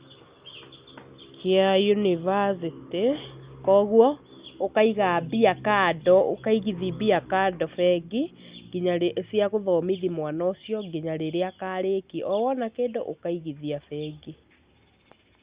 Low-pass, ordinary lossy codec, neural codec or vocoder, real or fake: 3.6 kHz; Opus, 64 kbps; none; real